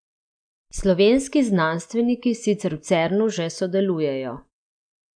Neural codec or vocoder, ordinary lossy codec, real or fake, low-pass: none; none; real; 9.9 kHz